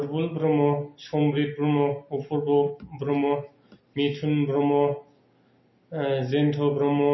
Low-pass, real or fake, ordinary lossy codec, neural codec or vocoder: 7.2 kHz; real; MP3, 24 kbps; none